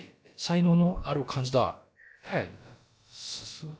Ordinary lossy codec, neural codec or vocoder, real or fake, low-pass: none; codec, 16 kHz, about 1 kbps, DyCAST, with the encoder's durations; fake; none